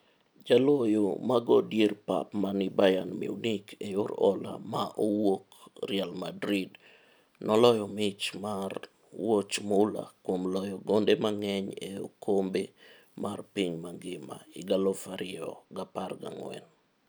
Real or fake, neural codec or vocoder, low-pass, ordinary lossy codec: real; none; none; none